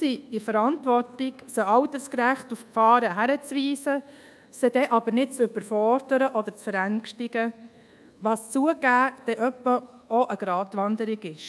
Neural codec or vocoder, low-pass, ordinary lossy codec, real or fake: codec, 24 kHz, 1.2 kbps, DualCodec; none; none; fake